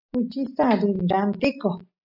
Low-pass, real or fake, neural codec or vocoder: 5.4 kHz; real; none